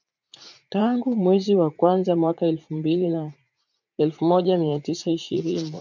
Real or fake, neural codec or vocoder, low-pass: fake; vocoder, 44.1 kHz, 80 mel bands, Vocos; 7.2 kHz